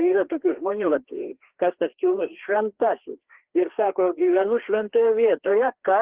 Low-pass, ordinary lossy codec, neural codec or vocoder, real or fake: 3.6 kHz; Opus, 16 kbps; codec, 16 kHz, 2 kbps, FreqCodec, larger model; fake